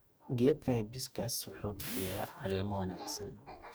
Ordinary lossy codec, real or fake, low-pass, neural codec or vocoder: none; fake; none; codec, 44.1 kHz, 2.6 kbps, DAC